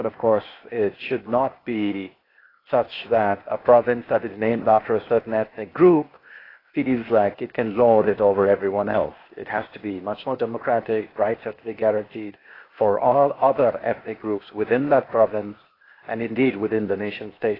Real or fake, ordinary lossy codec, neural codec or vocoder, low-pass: fake; AAC, 24 kbps; codec, 16 kHz, 0.8 kbps, ZipCodec; 5.4 kHz